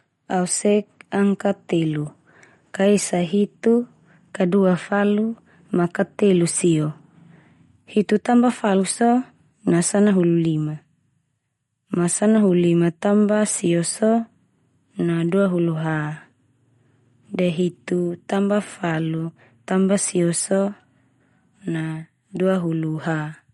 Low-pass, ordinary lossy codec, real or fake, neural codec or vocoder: 19.8 kHz; MP3, 48 kbps; real; none